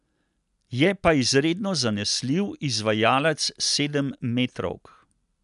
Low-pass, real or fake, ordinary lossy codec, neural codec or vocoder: 10.8 kHz; real; none; none